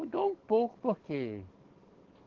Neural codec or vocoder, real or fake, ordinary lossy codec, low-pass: codec, 44.1 kHz, 7.8 kbps, DAC; fake; Opus, 16 kbps; 7.2 kHz